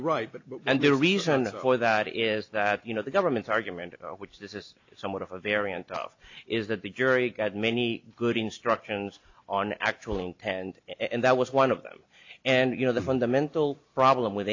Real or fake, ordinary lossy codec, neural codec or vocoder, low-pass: real; AAC, 48 kbps; none; 7.2 kHz